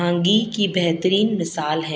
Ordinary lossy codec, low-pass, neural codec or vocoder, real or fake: none; none; none; real